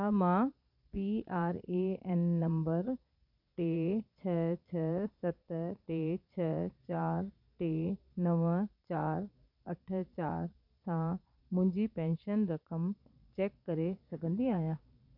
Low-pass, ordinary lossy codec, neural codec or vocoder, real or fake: 5.4 kHz; none; vocoder, 22.05 kHz, 80 mel bands, Vocos; fake